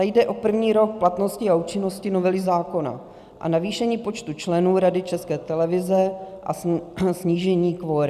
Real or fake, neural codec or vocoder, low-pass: real; none; 14.4 kHz